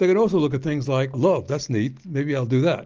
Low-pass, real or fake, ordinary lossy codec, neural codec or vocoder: 7.2 kHz; real; Opus, 24 kbps; none